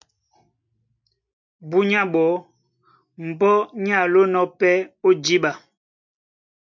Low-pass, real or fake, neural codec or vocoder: 7.2 kHz; real; none